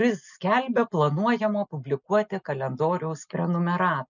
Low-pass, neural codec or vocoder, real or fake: 7.2 kHz; none; real